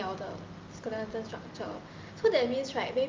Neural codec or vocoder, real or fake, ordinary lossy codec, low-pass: none; real; Opus, 32 kbps; 7.2 kHz